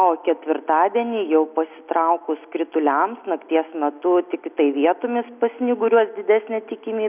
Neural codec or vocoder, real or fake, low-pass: none; real; 3.6 kHz